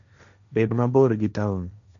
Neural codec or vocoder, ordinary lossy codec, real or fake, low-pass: codec, 16 kHz, 1.1 kbps, Voila-Tokenizer; none; fake; 7.2 kHz